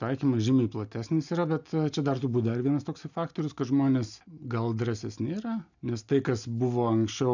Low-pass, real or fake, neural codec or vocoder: 7.2 kHz; real; none